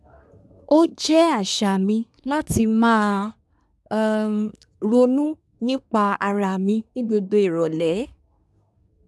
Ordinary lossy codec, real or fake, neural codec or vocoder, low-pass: none; fake; codec, 24 kHz, 1 kbps, SNAC; none